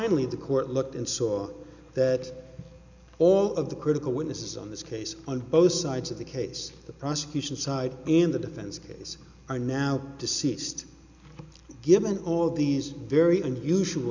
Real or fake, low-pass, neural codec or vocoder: real; 7.2 kHz; none